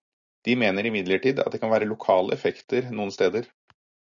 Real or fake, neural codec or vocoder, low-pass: real; none; 7.2 kHz